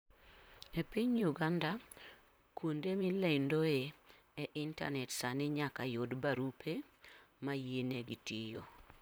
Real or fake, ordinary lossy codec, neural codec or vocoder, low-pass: fake; none; vocoder, 44.1 kHz, 128 mel bands, Pupu-Vocoder; none